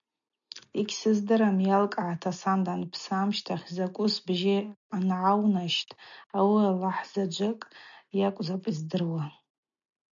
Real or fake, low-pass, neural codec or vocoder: real; 7.2 kHz; none